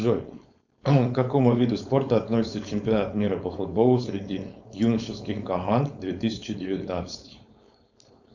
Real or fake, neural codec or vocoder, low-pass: fake; codec, 16 kHz, 4.8 kbps, FACodec; 7.2 kHz